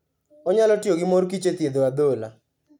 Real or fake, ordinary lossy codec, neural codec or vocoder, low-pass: real; none; none; 19.8 kHz